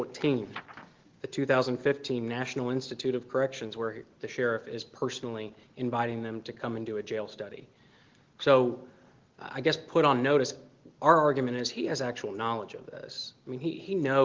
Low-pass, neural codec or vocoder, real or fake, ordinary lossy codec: 7.2 kHz; none; real; Opus, 16 kbps